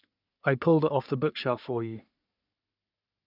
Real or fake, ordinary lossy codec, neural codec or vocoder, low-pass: fake; none; codec, 44.1 kHz, 3.4 kbps, Pupu-Codec; 5.4 kHz